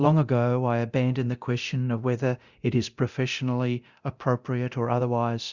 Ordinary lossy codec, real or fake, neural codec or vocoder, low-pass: Opus, 64 kbps; fake; codec, 24 kHz, 0.9 kbps, DualCodec; 7.2 kHz